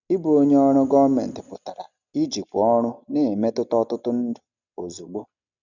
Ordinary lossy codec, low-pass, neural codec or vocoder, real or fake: none; 7.2 kHz; none; real